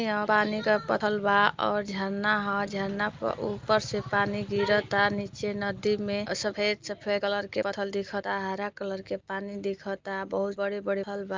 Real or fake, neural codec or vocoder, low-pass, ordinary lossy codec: real; none; 7.2 kHz; Opus, 32 kbps